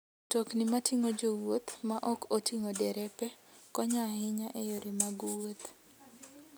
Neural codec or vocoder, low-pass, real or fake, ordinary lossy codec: none; none; real; none